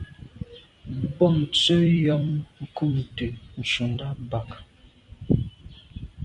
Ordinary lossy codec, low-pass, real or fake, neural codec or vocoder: MP3, 64 kbps; 10.8 kHz; fake; vocoder, 44.1 kHz, 128 mel bands every 256 samples, BigVGAN v2